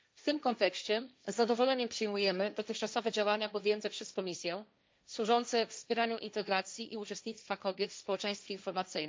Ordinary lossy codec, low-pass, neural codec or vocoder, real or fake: none; none; codec, 16 kHz, 1.1 kbps, Voila-Tokenizer; fake